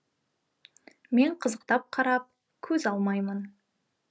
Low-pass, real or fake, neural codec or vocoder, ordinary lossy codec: none; real; none; none